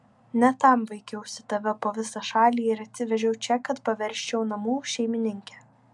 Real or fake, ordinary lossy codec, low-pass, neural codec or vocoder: real; MP3, 96 kbps; 10.8 kHz; none